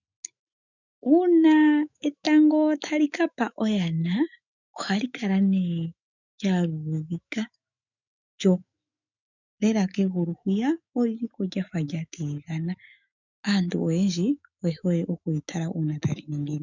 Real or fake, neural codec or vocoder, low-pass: fake; autoencoder, 48 kHz, 128 numbers a frame, DAC-VAE, trained on Japanese speech; 7.2 kHz